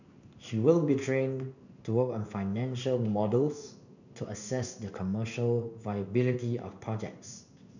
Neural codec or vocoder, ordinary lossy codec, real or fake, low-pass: codec, 16 kHz in and 24 kHz out, 1 kbps, XY-Tokenizer; none; fake; 7.2 kHz